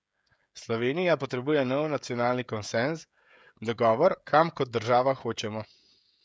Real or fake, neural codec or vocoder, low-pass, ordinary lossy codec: fake; codec, 16 kHz, 16 kbps, FreqCodec, smaller model; none; none